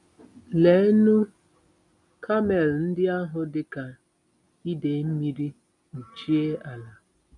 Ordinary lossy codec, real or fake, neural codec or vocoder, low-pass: none; real; none; 10.8 kHz